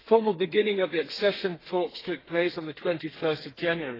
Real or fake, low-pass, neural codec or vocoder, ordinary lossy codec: fake; 5.4 kHz; codec, 32 kHz, 1.9 kbps, SNAC; AAC, 24 kbps